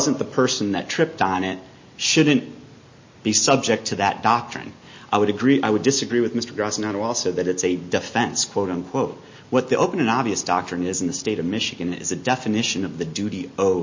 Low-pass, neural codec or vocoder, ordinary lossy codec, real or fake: 7.2 kHz; none; MP3, 32 kbps; real